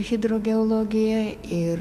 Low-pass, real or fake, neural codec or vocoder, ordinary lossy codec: 14.4 kHz; fake; autoencoder, 48 kHz, 128 numbers a frame, DAC-VAE, trained on Japanese speech; AAC, 96 kbps